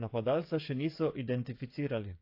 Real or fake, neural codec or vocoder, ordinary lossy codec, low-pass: fake; codec, 16 kHz, 8 kbps, FreqCodec, smaller model; AAC, 32 kbps; 5.4 kHz